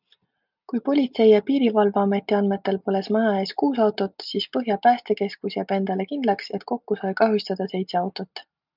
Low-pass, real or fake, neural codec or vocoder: 5.4 kHz; real; none